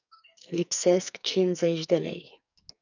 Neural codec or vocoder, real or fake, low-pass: codec, 44.1 kHz, 2.6 kbps, SNAC; fake; 7.2 kHz